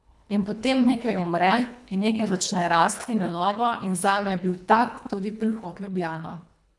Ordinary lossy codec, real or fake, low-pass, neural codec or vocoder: none; fake; none; codec, 24 kHz, 1.5 kbps, HILCodec